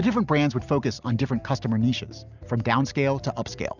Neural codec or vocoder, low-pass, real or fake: none; 7.2 kHz; real